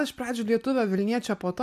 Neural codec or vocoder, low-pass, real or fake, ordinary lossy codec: none; 14.4 kHz; real; AAC, 96 kbps